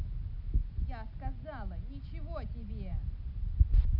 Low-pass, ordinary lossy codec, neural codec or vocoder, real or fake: 5.4 kHz; none; none; real